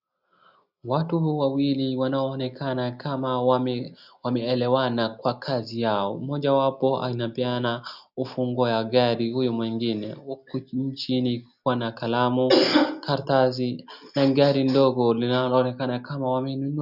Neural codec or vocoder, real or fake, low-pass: none; real; 5.4 kHz